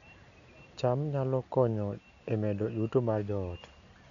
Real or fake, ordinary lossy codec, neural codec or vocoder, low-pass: real; none; none; 7.2 kHz